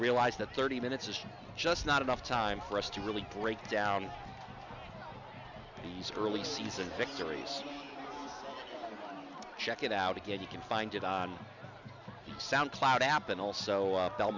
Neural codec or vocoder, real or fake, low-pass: none; real; 7.2 kHz